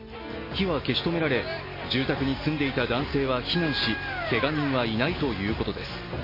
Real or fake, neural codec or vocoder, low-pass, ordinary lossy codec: real; none; 5.4 kHz; MP3, 24 kbps